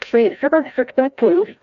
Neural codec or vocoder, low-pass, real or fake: codec, 16 kHz, 0.5 kbps, FreqCodec, larger model; 7.2 kHz; fake